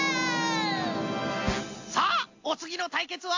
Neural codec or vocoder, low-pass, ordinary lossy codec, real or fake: none; 7.2 kHz; AAC, 48 kbps; real